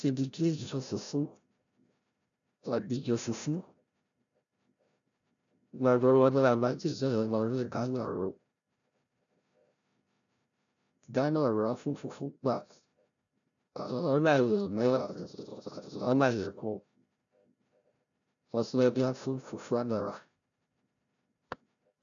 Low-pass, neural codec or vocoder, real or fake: 7.2 kHz; codec, 16 kHz, 0.5 kbps, FreqCodec, larger model; fake